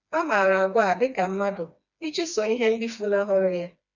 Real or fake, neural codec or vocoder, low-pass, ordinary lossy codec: fake; codec, 16 kHz, 2 kbps, FreqCodec, smaller model; 7.2 kHz; none